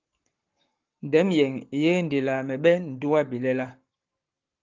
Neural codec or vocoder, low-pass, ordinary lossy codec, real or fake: codec, 44.1 kHz, 7.8 kbps, Pupu-Codec; 7.2 kHz; Opus, 32 kbps; fake